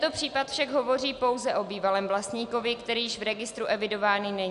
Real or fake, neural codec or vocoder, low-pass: real; none; 10.8 kHz